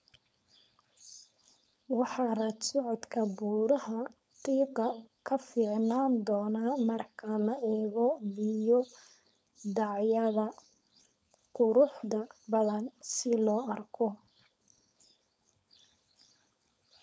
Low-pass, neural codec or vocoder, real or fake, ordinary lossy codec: none; codec, 16 kHz, 4.8 kbps, FACodec; fake; none